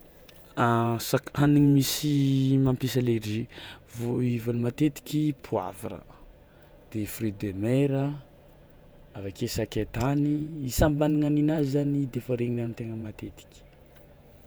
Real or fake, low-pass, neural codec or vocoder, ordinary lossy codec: fake; none; vocoder, 48 kHz, 128 mel bands, Vocos; none